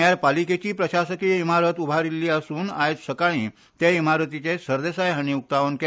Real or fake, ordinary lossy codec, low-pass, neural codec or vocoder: real; none; none; none